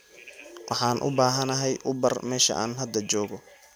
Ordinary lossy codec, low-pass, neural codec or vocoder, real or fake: none; none; none; real